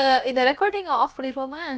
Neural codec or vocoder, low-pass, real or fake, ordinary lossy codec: codec, 16 kHz, about 1 kbps, DyCAST, with the encoder's durations; none; fake; none